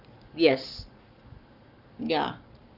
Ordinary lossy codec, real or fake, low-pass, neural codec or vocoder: none; fake; 5.4 kHz; codec, 44.1 kHz, 7.8 kbps, Pupu-Codec